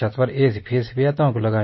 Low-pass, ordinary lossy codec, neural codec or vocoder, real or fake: 7.2 kHz; MP3, 24 kbps; none; real